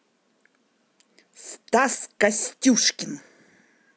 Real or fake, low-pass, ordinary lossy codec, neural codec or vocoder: real; none; none; none